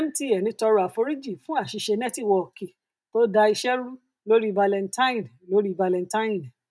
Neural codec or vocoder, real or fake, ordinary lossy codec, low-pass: none; real; none; none